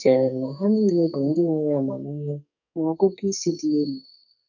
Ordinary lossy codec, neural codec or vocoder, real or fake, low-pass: none; codec, 44.1 kHz, 2.6 kbps, SNAC; fake; 7.2 kHz